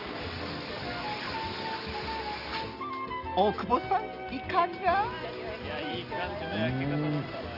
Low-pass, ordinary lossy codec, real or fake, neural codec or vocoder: 5.4 kHz; Opus, 32 kbps; real; none